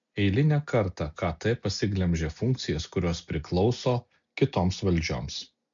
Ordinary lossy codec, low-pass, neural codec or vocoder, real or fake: MP3, 64 kbps; 7.2 kHz; none; real